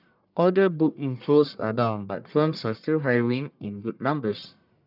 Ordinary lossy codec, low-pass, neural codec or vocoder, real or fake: none; 5.4 kHz; codec, 44.1 kHz, 1.7 kbps, Pupu-Codec; fake